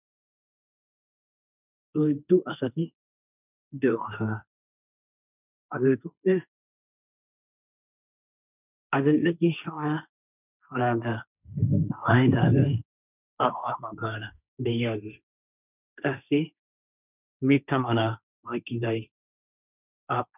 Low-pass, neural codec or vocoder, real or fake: 3.6 kHz; codec, 16 kHz, 1.1 kbps, Voila-Tokenizer; fake